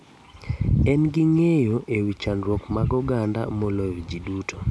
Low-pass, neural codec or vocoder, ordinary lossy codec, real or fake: none; none; none; real